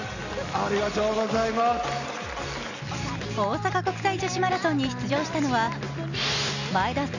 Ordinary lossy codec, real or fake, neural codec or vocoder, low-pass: none; real; none; 7.2 kHz